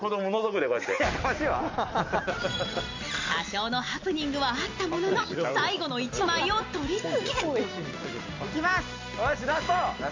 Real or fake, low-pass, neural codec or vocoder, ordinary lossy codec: real; 7.2 kHz; none; MP3, 48 kbps